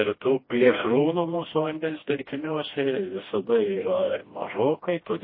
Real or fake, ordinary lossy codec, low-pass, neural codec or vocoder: fake; MP3, 24 kbps; 5.4 kHz; codec, 16 kHz, 1 kbps, FreqCodec, smaller model